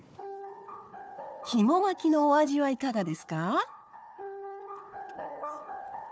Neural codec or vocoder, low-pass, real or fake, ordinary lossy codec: codec, 16 kHz, 4 kbps, FunCodec, trained on Chinese and English, 50 frames a second; none; fake; none